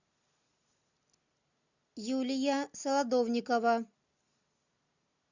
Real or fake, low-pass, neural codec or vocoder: real; 7.2 kHz; none